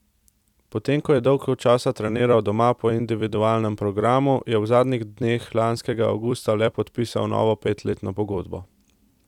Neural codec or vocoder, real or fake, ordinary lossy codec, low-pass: vocoder, 44.1 kHz, 128 mel bands every 256 samples, BigVGAN v2; fake; none; 19.8 kHz